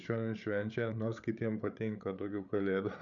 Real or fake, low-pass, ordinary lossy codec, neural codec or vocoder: fake; 7.2 kHz; MP3, 64 kbps; codec, 16 kHz, 8 kbps, FreqCodec, larger model